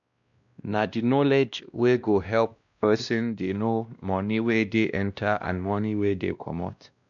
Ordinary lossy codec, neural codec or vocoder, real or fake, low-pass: none; codec, 16 kHz, 1 kbps, X-Codec, WavLM features, trained on Multilingual LibriSpeech; fake; 7.2 kHz